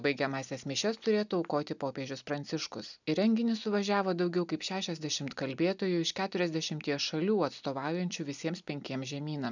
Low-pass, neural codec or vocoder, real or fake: 7.2 kHz; none; real